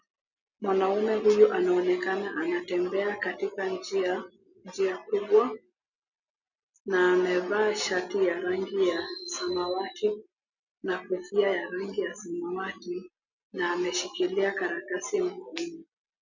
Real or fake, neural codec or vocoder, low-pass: real; none; 7.2 kHz